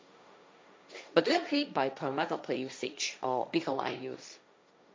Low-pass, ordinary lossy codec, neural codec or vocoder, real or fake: none; none; codec, 16 kHz, 1.1 kbps, Voila-Tokenizer; fake